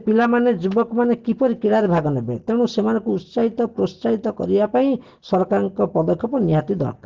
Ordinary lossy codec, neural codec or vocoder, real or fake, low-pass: Opus, 16 kbps; none; real; 7.2 kHz